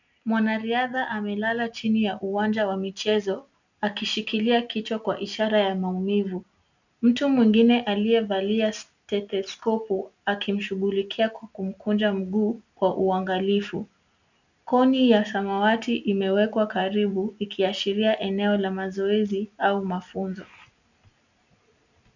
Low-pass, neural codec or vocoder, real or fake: 7.2 kHz; none; real